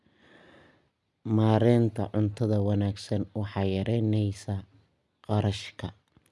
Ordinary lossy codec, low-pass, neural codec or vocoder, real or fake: none; none; none; real